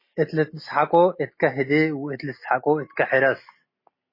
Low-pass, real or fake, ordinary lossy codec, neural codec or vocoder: 5.4 kHz; real; MP3, 24 kbps; none